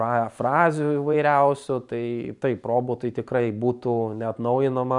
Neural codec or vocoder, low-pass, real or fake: vocoder, 44.1 kHz, 128 mel bands every 512 samples, BigVGAN v2; 10.8 kHz; fake